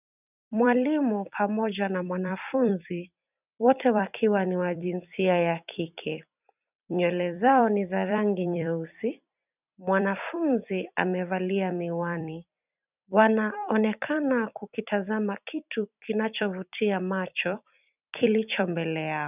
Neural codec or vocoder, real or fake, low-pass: vocoder, 44.1 kHz, 128 mel bands every 256 samples, BigVGAN v2; fake; 3.6 kHz